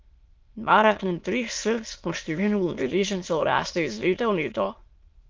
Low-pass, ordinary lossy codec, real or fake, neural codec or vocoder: 7.2 kHz; Opus, 24 kbps; fake; autoencoder, 22.05 kHz, a latent of 192 numbers a frame, VITS, trained on many speakers